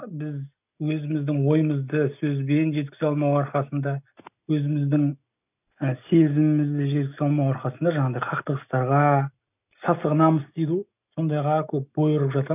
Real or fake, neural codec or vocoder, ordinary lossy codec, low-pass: real; none; none; 3.6 kHz